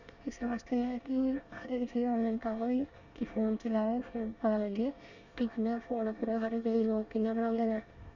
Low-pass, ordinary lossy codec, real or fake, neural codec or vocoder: 7.2 kHz; none; fake; codec, 24 kHz, 1 kbps, SNAC